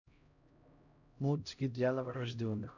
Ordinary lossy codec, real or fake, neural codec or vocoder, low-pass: none; fake; codec, 16 kHz, 0.5 kbps, X-Codec, HuBERT features, trained on LibriSpeech; 7.2 kHz